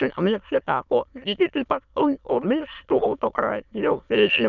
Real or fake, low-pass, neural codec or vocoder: fake; 7.2 kHz; autoencoder, 22.05 kHz, a latent of 192 numbers a frame, VITS, trained on many speakers